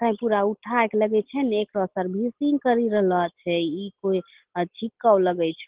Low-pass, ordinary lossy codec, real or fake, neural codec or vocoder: 3.6 kHz; Opus, 16 kbps; real; none